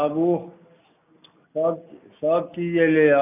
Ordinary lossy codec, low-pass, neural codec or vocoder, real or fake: none; 3.6 kHz; none; real